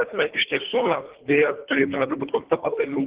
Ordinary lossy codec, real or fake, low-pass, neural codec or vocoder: Opus, 16 kbps; fake; 3.6 kHz; codec, 24 kHz, 1.5 kbps, HILCodec